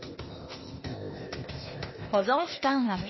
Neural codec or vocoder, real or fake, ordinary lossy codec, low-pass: codec, 16 kHz, 0.8 kbps, ZipCodec; fake; MP3, 24 kbps; 7.2 kHz